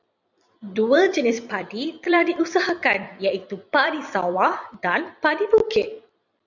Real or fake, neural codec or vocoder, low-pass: fake; vocoder, 44.1 kHz, 128 mel bands every 256 samples, BigVGAN v2; 7.2 kHz